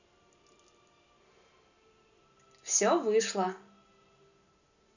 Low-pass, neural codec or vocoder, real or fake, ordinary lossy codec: 7.2 kHz; none; real; none